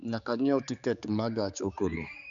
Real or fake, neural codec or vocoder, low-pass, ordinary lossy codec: fake; codec, 16 kHz, 4 kbps, X-Codec, HuBERT features, trained on general audio; 7.2 kHz; none